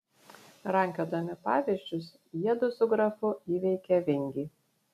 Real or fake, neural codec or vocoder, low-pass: real; none; 14.4 kHz